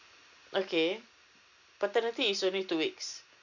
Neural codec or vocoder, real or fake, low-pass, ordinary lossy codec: none; real; 7.2 kHz; none